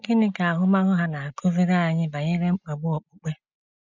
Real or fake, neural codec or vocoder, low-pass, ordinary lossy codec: real; none; 7.2 kHz; none